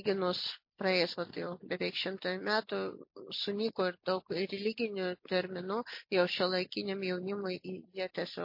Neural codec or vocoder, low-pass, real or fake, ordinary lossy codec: none; 5.4 kHz; real; MP3, 32 kbps